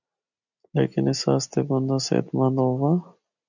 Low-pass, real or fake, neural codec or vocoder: 7.2 kHz; real; none